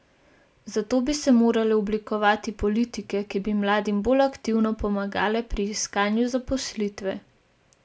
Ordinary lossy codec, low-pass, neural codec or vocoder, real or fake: none; none; none; real